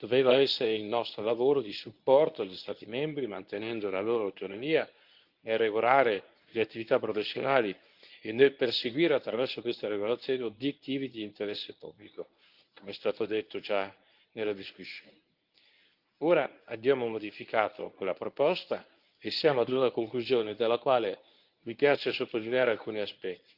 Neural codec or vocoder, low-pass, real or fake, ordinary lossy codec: codec, 24 kHz, 0.9 kbps, WavTokenizer, medium speech release version 1; 5.4 kHz; fake; Opus, 24 kbps